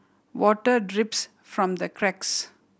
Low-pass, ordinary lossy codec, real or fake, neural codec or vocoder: none; none; real; none